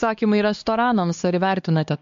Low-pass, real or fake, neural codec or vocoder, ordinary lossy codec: 7.2 kHz; fake; codec, 16 kHz, 4 kbps, X-Codec, HuBERT features, trained on LibriSpeech; MP3, 48 kbps